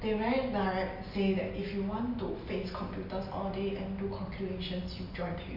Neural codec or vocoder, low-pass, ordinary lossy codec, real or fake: none; 5.4 kHz; none; real